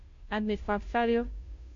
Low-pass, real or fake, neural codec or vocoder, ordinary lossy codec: 7.2 kHz; fake; codec, 16 kHz, 0.5 kbps, FunCodec, trained on Chinese and English, 25 frames a second; AAC, 48 kbps